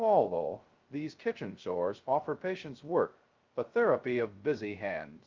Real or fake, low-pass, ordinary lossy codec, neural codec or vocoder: fake; 7.2 kHz; Opus, 32 kbps; codec, 16 kHz, 0.2 kbps, FocalCodec